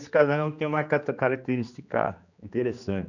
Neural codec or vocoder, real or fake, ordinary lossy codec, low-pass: codec, 16 kHz, 2 kbps, X-Codec, HuBERT features, trained on general audio; fake; none; 7.2 kHz